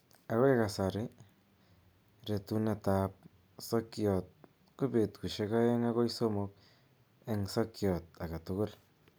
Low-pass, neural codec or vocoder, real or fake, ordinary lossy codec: none; none; real; none